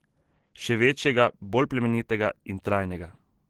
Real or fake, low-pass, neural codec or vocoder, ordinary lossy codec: fake; 19.8 kHz; codec, 44.1 kHz, 7.8 kbps, DAC; Opus, 16 kbps